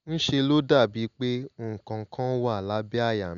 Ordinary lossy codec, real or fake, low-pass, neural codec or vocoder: MP3, 96 kbps; real; 7.2 kHz; none